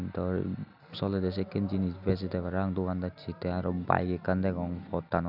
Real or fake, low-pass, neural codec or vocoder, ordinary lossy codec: real; 5.4 kHz; none; none